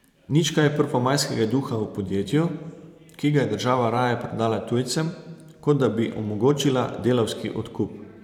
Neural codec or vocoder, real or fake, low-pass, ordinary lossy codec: vocoder, 44.1 kHz, 128 mel bands every 512 samples, BigVGAN v2; fake; 19.8 kHz; none